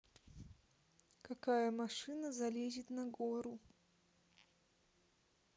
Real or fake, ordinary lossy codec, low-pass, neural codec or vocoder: real; none; none; none